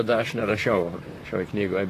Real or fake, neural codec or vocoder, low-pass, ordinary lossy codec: fake; vocoder, 44.1 kHz, 128 mel bands, Pupu-Vocoder; 14.4 kHz; AAC, 48 kbps